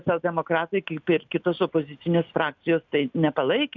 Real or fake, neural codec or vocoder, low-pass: real; none; 7.2 kHz